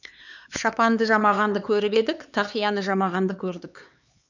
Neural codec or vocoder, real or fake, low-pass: codec, 16 kHz, 4 kbps, X-Codec, HuBERT features, trained on LibriSpeech; fake; 7.2 kHz